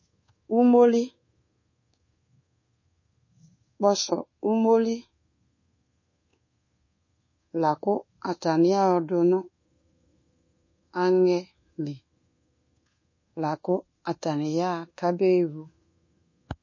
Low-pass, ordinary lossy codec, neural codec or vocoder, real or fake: 7.2 kHz; MP3, 32 kbps; codec, 24 kHz, 1.2 kbps, DualCodec; fake